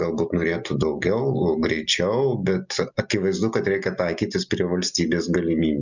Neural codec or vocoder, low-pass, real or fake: none; 7.2 kHz; real